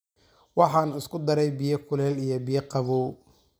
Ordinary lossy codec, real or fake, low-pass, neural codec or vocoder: none; real; none; none